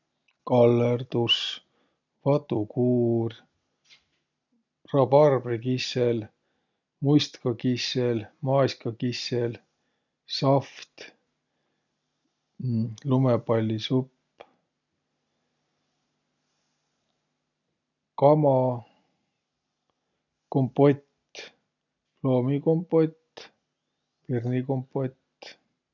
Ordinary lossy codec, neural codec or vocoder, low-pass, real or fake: none; none; 7.2 kHz; real